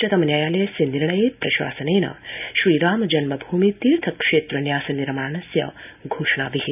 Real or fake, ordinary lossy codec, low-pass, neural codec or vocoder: real; none; 3.6 kHz; none